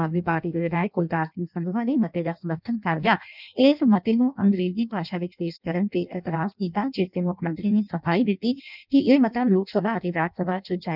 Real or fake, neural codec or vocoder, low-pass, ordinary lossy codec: fake; codec, 16 kHz in and 24 kHz out, 0.6 kbps, FireRedTTS-2 codec; 5.4 kHz; none